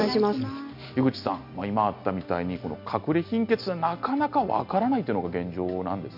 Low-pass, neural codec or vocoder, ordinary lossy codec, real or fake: 5.4 kHz; none; none; real